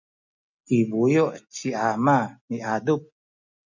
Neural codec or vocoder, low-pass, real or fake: none; 7.2 kHz; real